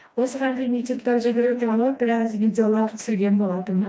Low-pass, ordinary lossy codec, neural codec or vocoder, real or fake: none; none; codec, 16 kHz, 1 kbps, FreqCodec, smaller model; fake